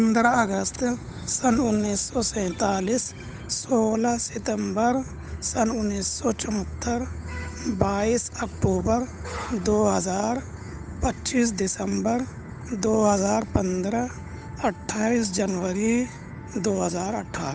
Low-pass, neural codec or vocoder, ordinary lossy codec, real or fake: none; codec, 16 kHz, 8 kbps, FunCodec, trained on Chinese and English, 25 frames a second; none; fake